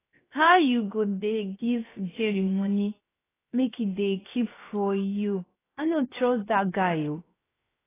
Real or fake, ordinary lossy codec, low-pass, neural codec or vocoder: fake; AAC, 16 kbps; 3.6 kHz; codec, 16 kHz, 0.7 kbps, FocalCodec